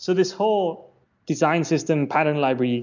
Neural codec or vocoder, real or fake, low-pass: none; real; 7.2 kHz